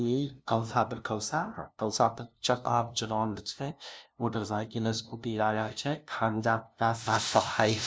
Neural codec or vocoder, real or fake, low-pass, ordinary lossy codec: codec, 16 kHz, 0.5 kbps, FunCodec, trained on LibriTTS, 25 frames a second; fake; none; none